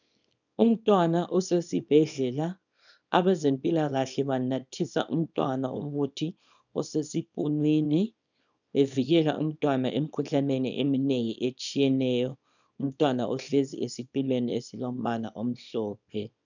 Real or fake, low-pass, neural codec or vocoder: fake; 7.2 kHz; codec, 24 kHz, 0.9 kbps, WavTokenizer, small release